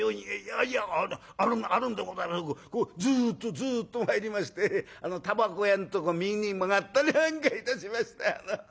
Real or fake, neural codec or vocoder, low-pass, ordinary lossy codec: real; none; none; none